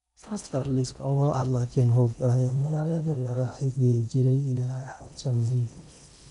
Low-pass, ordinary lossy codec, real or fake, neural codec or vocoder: 10.8 kHz; none; fake; codec, 16 kHz in and 24 kHz out, 0.6 kbps, FocalCodec, streaming, 4096 codes